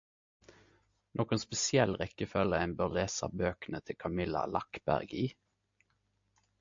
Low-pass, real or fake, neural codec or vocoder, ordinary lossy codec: 7.2 kHz; real; none; MP3, 48 kbps